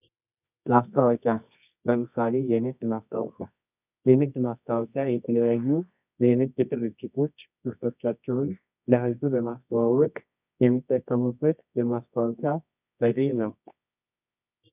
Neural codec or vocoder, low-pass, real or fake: codec, 24 kHz, 0.9 kbps, WavTokenizer, medium music audio release; 3.6 kHz; fake